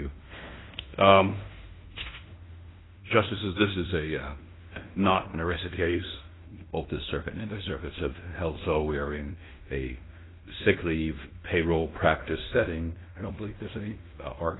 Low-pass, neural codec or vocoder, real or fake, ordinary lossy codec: 7.2 kHz; codec, 16 kHz in and 24 kHz out, 0.9 kbps, LongCat-Audio-Codec, fine tuned four codebook decoder; fake; AAC, 16 kbps